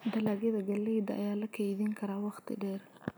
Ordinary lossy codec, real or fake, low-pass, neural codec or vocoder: none; real; none; none